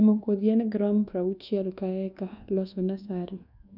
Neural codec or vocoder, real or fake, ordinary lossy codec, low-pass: codec, 24 kHz, 1.2 kbps, DualCodec; fake; none; 5.4 kHz